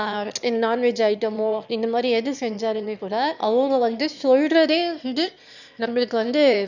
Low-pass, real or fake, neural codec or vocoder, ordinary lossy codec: 7.2 kHz; fake; autoencoder, 22.05 kHz, a latent of 192 numbers a frame, VITS, trained on one speaker; none